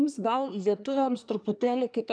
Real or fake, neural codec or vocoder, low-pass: fake; codec, 24 kHz, 1 kbps, SNAC; 9.9 kHz